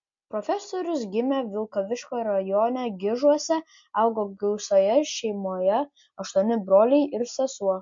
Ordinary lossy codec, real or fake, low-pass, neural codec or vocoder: MP3, 48 kbps; real; 7.2 kHz; none